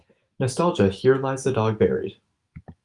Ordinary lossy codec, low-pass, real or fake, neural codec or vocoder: Opus, 32 kbps; 10.8 kHz; fake; autoencoder, 48 kHz, 128 numbers a frame, DAC-VAE, trained on Japanese speech